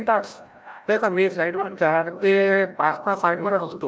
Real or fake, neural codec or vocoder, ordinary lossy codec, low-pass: fake; codec, 16 kHz, 0.5 kbps, FreqCodec, larger model; none; none